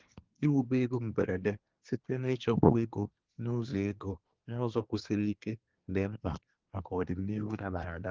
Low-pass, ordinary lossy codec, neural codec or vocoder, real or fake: 7.2 kHz; Opus, 16 kbps; codec, 24 kHz, 1 kbps, SNAC; fake